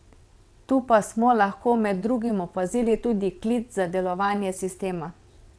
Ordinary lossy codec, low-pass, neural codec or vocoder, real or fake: none; none; vocoder, 22.05 kHz, 80 mel bands, WaveNeXt; fake